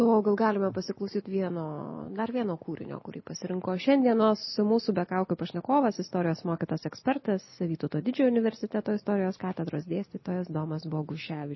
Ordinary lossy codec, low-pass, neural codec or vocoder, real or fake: MP3, 24 kbps; 7.2 kHz; none; real